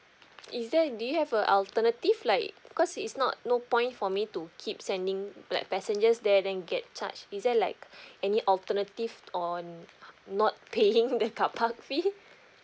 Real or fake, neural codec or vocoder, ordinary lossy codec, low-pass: real; none; none; none